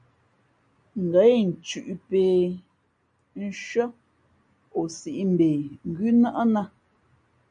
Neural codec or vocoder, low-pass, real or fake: none; 9.9 kHz; real